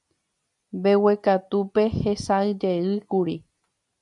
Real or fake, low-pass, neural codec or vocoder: real; 10.8 kHz; none